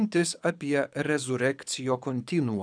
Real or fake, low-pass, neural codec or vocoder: fake; 9.9 kHz; codec, 24 kHz, 6 kbps, HILCodec